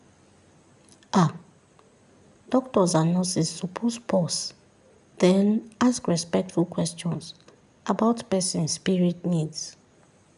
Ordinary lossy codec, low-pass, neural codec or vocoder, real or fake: none; 10.8 kHz; none; real